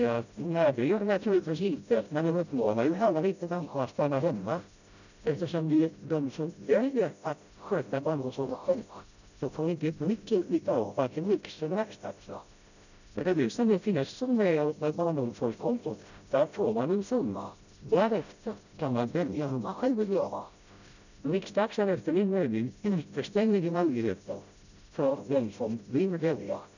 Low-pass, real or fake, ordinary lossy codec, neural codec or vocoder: 7.2 kHz; fake; none; codec, 16 kHz, 0.5 kbps, FreqCodec, smaller model